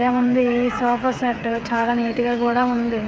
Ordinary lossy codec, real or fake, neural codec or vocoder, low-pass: none; fake; codec, 16 kHz, 8 kbps, FreqCodec, smaller model; none